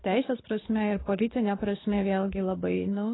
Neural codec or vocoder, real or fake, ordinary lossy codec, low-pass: codec, 24 kHz, 6 kbps, HILCodec; fake; AAC, 16 kbps; 7.2 kHz